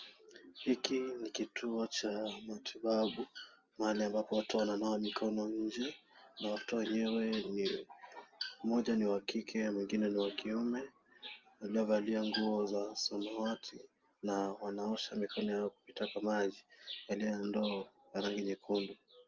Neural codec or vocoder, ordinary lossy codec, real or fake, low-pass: none; Opus, 32 kbps; real; 7.2 kHz